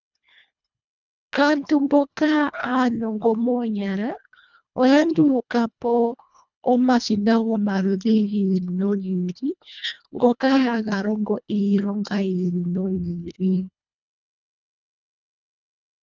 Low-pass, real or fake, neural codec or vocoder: 7.2 kHz; fake; codec, 24 kHz, 1.5 kbps, HILCodec